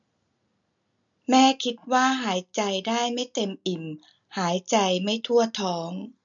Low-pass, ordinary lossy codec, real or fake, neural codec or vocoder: 7.2 kHz; none; real; none